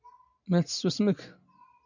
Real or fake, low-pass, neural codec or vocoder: real; 7.2 kHz; none